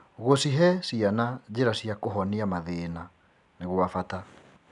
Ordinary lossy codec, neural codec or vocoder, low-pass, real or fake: none; none; 10.8 kHz; real